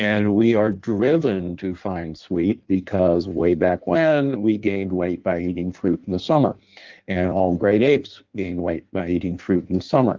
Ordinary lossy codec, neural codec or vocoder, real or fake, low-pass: Opus, 32 kbps; codec, 16 kHz in and 24 kHz out, 1.1 kbps, FireRedTTS-2 codec; fake; 7.2 kHz